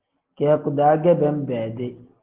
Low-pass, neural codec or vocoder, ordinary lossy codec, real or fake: 3.6 kHz; none; Opus, 16 kbps; real